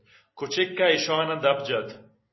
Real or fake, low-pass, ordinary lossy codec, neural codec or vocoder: real; 7.2 kHz; MP3, 24 kbps; none